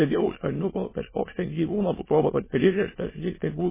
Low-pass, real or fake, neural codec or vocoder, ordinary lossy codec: 3.6 kHz; fake; autoencoder, 22.05 kHz, a latent of 192 numbers a frame, VITS, trained on many speakers; MP3, 16 kbps